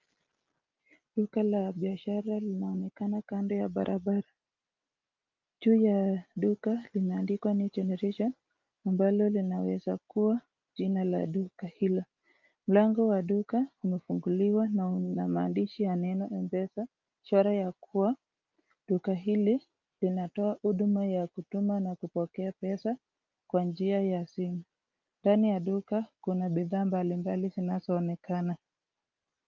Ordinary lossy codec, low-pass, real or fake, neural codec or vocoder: Opus, 24 kbps; 7.2 kHz; real; none